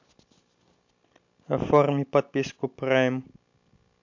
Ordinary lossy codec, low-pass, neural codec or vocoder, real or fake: MP3, 64 kbps; 7.2 kHz; none; real